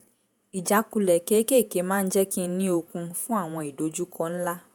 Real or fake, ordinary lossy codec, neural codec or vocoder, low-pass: fake; none; vocoder, 48 kHz, 128 mel bands, Vocos; none